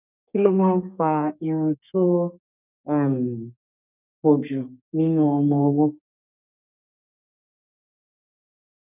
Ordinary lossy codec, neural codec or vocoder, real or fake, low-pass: none; codec, 32 kHz, 1.9 kbps, SNAC; fake; 3.6 kHz